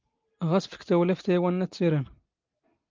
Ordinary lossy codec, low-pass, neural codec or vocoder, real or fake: Opus, 32 kbps; 7.2 kHz; none; real